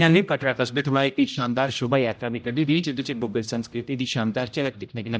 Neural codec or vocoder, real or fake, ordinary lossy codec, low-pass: codec, 16 kHz, 0.5 kbps, X-Codec, HuBERT features, trained on general audio; fake; none; none